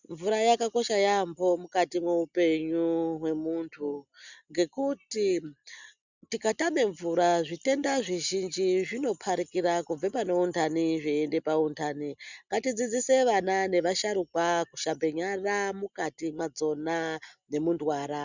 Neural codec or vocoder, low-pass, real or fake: none; 7.2 kHz; real